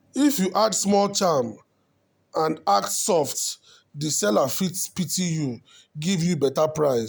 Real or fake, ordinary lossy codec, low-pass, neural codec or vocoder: real; none; none; none